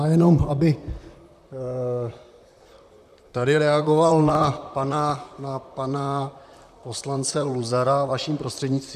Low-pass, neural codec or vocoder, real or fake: 14.4 kHz; vocoder, 44.1 kHz, 128 mel bands, Pupu-Vocoder; fake